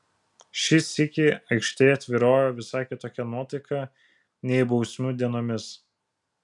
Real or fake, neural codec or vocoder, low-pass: real; none; 10.8 kHz